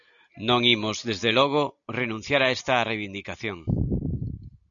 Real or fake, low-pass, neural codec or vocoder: real; 7.2 kHz; none